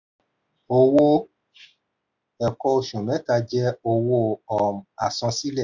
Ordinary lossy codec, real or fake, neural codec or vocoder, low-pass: none; real; none; 7.2 kHz